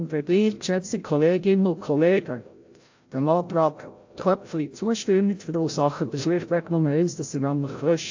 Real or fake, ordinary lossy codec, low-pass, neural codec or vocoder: fake; AAC, 48 kbps; 7.2 kHz; codec, 16 kHz, 0.5 kbps, FreqCodec, larger model